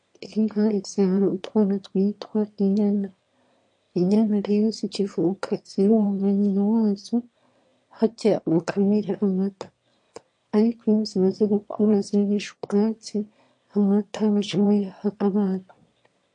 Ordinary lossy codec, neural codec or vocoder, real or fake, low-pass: MP3, 48 kbps; autoencoder, 22.05 kHz, a latent of 192 numbers a frame, VITS, trained on one speaker; fake; 9.9 kHz